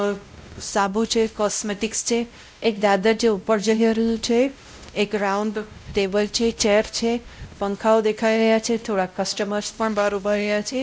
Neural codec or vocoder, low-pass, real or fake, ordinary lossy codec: codec, 16 kHz, 0.5 kbps, X-Codec, WavLM features, trained on Multilingual LibriSpeech; none; fake; none